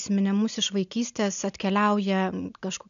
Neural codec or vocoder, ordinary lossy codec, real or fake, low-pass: none; MP3, 96 kbps; real; 7.2 kHz